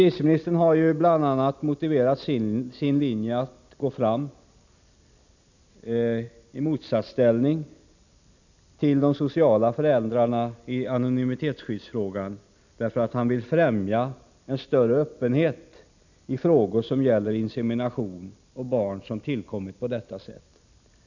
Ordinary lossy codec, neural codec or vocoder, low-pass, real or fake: none; none; 7.2 kHz; real